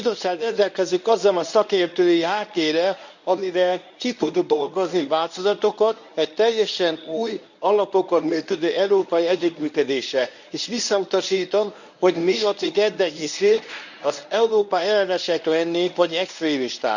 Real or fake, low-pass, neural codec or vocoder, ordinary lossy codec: fake; 7.2 kHz; codec, 24 kHz, 0.9 kbps, WavTokenizer, medium speech release version 1; none